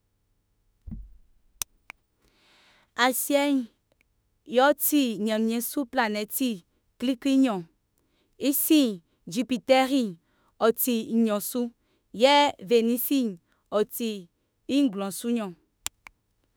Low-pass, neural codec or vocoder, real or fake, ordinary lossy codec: none; autoencoder, 48 kHz, 32 numbers a frame, DAC-VAE, trained on Japanese speech; fake; none